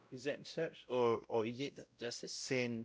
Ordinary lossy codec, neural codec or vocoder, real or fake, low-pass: none; codec, 16 kHz, 0.5 kbps, X-Codec, WavLM features, trained on Multilingual LibriSpeech; fake; none